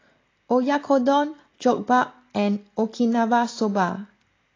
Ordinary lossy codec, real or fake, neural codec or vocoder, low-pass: AAC, 32 kbps; real; none; 7.2 kHz